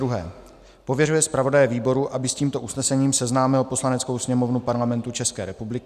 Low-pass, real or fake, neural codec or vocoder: 14.4 kHz; real; none